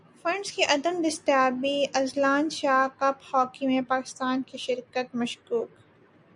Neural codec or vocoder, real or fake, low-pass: none; real; 10.8 kHz